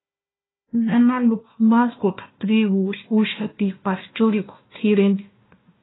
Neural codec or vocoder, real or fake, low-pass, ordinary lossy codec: codec, 16 kHz, 1 kbps, FunCodec, trained on Chinese and English, 50 frames a second; fake; 7.2 kHz; AAC, 16 kbps